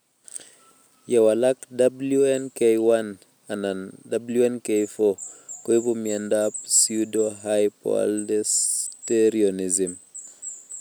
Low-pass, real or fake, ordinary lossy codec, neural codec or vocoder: none; real; none; none